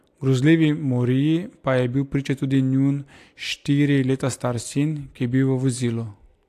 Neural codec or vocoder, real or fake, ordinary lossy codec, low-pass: none; real; AAC, 64 kbps; 14.4 kHz